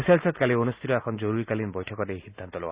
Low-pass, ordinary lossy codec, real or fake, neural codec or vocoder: 3.6 kHz; Opus, 64 kbps; real; none